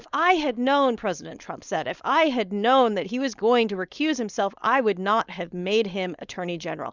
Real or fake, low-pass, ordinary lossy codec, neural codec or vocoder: fake; 7.2 kHz; Opus, 64 kbps; codec, 16 kHz, 4.8 kbps, FACodec